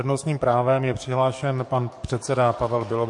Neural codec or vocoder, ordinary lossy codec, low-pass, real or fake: codec, 44.1 kHz, 7.8 kbps, DAC; MP3, 48 kbps; 10.8 kHz; fake